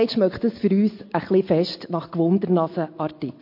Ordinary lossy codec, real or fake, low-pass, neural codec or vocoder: MP3, 32 kbps; real; 5.4 kHz; none